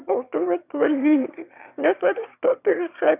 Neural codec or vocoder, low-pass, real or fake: autoencoder, 22.05 kHz, a latent of 192 numbers a frame, VITS, trained on one speaker; 3.6 kHz; fake